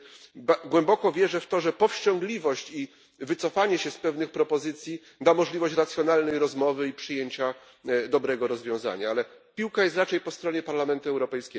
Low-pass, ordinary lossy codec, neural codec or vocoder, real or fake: none; none; none; real